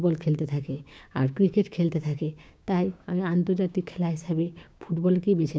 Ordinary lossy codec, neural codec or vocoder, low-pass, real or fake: none; codec, 16 kHz, 6 kbps, DAC; none; fake